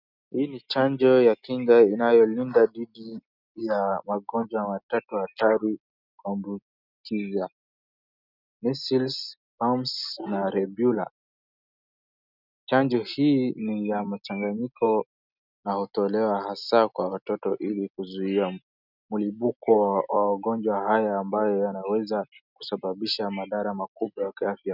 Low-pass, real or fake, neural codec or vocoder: 5.4 kHz; real; none